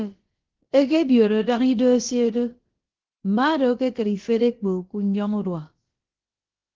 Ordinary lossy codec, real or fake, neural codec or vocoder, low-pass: Opus, 16 kbps; fake; codec, 16 kHz, about 1 kbps, DyCAST, with the encoder's durations; 7.2 kHz